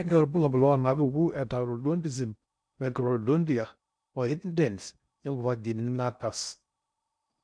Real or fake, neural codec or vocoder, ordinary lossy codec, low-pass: fake; codec, 16 kHz in and 24 kHz out, 0.6 kbps, FocalCodec, streaming, 2048 codes; none; 9.9 kHz